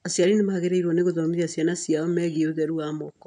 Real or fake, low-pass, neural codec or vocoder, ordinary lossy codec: real; 9.9 kHz; none; none